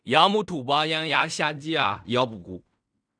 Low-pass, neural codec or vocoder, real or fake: 9.9 kHz; codec, 16 kHz in and 24 kHz out, 0.4 kbps, LongCat-Audio-Codec, fine tuned four codebook decoder; fake